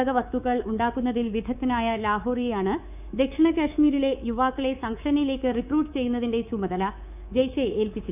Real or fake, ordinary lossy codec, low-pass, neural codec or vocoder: fake; none; 3.6 kHz; codec, 24 kHz, 3.1 kbps, DualCodec